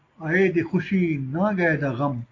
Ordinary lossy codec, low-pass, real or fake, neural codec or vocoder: AAC, 32 kbps; 7.2 kHz; real; none